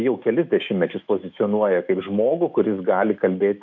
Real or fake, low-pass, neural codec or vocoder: fake; 7.2 kHz; autoencoder, 48 kHz, 128 numbers a frame, DAC-VAE, trained on Japanese speech